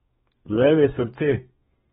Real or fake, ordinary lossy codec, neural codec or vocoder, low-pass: fake; AAC, 16 kbps; codec, 32 kHz, 1.9 kbps, SNAC; 14.4 kHz